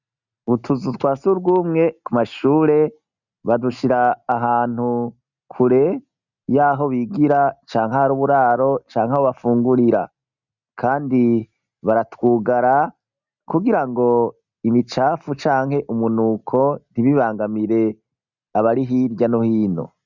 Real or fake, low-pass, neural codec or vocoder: real; 7.2 kHz; none